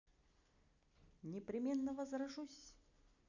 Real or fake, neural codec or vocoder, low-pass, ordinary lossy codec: real; none; 7.2 kHz; none